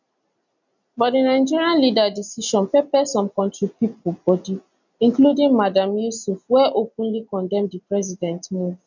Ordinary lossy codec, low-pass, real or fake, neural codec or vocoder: none; 7.2 kHz; real; none